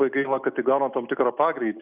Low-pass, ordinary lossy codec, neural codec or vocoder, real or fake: 3.6 kHz; Opus, 64 kbps; none; real